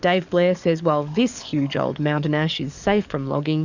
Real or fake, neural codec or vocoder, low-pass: fake; codec, 44.1 kHz, 7.8 kbps, DAC; 7.2 kHz